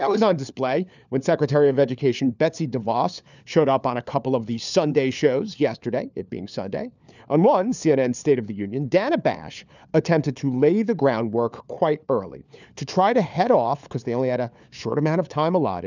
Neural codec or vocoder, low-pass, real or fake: codec, 16 kHz, 4 kbps, FunCodec, trained on LibriTTS, 50 frames a second; 7.2 kHz; fake